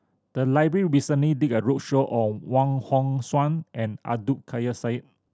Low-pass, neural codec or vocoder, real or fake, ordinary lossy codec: none; none; real; none